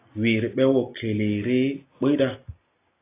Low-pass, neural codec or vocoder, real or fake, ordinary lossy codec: 3.6 kHz; none; real; AAC, 24 kbps